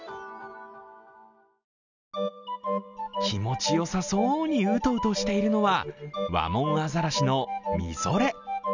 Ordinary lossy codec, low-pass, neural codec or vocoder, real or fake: none; 7.2 kHz; none; real